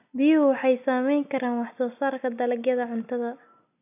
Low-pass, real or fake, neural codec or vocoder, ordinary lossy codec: 3.6 kHz; real; none; none